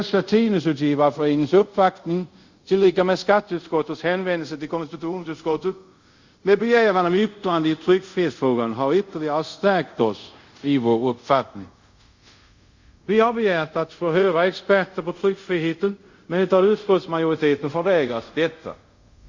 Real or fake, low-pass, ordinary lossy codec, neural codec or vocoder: fake; 7.2 kHz; Opus, 64 kbps; codec, 24 kHz, 0.5 kbps, DualCodec